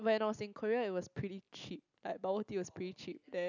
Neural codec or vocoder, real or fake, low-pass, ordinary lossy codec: none; real; 7.2 kHz; none